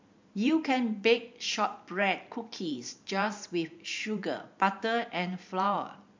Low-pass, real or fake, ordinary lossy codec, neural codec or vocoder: 7.2 kHz; fake; MP3, 64 kbps; vocoder, 44.1 kHz, 128 mel bands every 512 samples, BigVGAN v2